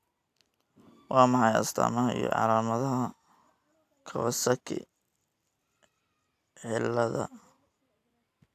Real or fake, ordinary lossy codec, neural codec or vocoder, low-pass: real; none; none; 14.4 kHz